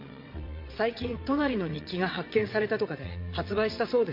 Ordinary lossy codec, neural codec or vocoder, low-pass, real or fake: MP3, 32 kbps; vocoder, 22.05 kHz, 80 mel bands, WaveNeXt; 5.4 kHz; fake